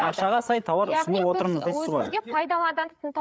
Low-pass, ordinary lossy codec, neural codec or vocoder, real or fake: none; none; codec, 16 kHz, 8 kbps, FreqCodec, larger model; fake